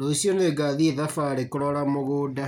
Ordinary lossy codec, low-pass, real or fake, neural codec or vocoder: none; 19.8 kHz; real; none